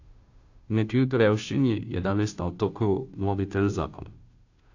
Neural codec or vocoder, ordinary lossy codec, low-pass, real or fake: codec, 16 kHz, 0.5 kbps, FunCodec, trained on Chinese and English, 25 frames a second; AAC, 48 kbps; 7.2 kHz; fake